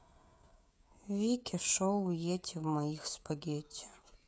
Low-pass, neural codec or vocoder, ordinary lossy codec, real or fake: none; codec, 16 kHz, 16 kbps, FreqCodec, smaller model; none; fake